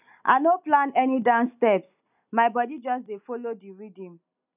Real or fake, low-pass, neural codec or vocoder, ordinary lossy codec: real; 3.6 kHz; none; none